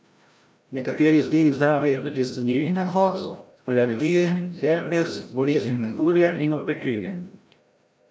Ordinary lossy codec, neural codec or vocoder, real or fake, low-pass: none; codec, 16 kHz, 0.5 kbps, FreqCodec, larger model; fake; none